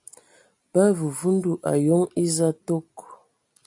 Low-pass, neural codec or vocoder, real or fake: 10.8 kHz; none; real